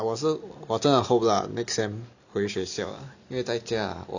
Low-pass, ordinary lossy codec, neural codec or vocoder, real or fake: 7.2 kHz; none; none; real